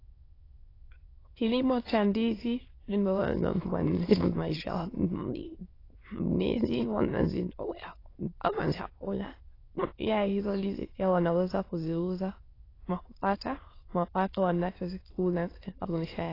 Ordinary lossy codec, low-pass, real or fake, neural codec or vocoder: AAC, 24 kbps; 5.4 kHz; fake; autoencoder, 22.05 kHz, a latent of 192 numbers a frame, VITS, trained on many speakers